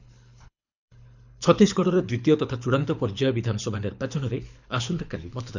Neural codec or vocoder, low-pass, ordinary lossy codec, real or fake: codec, 24 kHz, 6 kbps, HILCodec; 7.2 kHz; none; fake